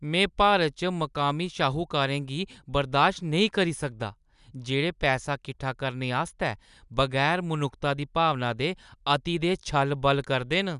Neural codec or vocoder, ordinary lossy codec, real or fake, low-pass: none; none; real; 10.8 kHz